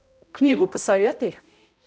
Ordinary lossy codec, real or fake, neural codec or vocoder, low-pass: none; fake; codec, 16 kHz, 0.5 kbps, X-Codec, HuBERT features, trained on balanced general audio; none